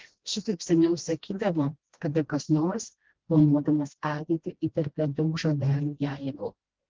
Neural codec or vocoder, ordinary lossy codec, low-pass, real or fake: codec, 16 kHz, 1 kbps, FreqCodec, smaller model; Opus, 16 kbps; 7.2 kHz; fake